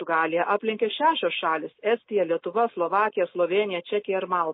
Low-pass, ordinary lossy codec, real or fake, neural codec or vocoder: 7.2 kHz; MP3, 24 kbps; real; none